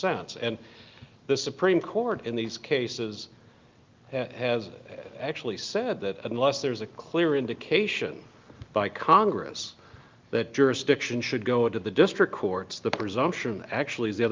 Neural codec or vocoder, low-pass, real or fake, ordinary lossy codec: none; 7.2 kHz; real; Opus, 32 kbps